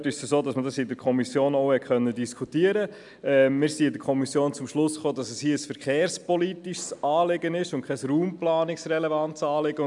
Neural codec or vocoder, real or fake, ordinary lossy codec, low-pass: none; real; none; 10.8 kHz